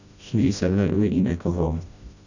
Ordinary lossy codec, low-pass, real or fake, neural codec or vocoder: none; 7.2 kHz; fake; codec, 16 kHz, 1 kbps, FreqCodec, smaller model